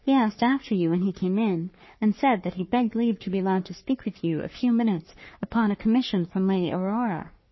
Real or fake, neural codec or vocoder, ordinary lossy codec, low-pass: fake; codec, 44.1 kHz, 3.4 kbps, Pupu-Codec; MP3, 24 kbps; 7.2 kHz